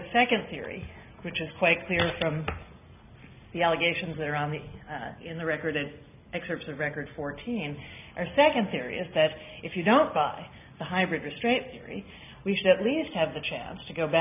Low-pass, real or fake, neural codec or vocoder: 3.6 kHz; real; none